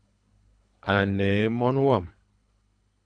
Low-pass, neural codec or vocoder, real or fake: 9.9 kHz; codec, 24 kHz, 3 kbps, HILCodec; fake